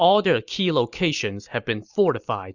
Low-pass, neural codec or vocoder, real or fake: 7.2 kHz; none; real